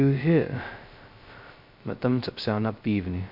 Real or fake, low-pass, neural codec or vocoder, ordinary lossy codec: fake; 5.4 kHz; codec, 16 kHz, 0.2 kbps, FocalCodec; MP3, 48 kbps